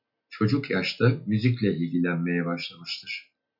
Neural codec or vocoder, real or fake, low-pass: none; real; 5.4 kHz